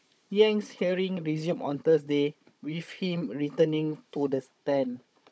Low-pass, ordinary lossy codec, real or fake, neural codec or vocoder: none; none; fake; codec, 16 kHz, 16 kbps, FunCodec, trained on Chinese and English, 50 frames a second